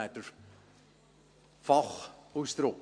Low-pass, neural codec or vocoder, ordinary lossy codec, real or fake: 9.9 kHz; none; MP3, 64 kbps; real